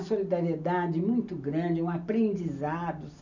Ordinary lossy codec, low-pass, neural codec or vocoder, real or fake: none; 7.2 kHz; none; real